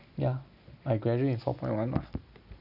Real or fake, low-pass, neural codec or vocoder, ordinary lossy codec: real; 5.4 kHz; none; none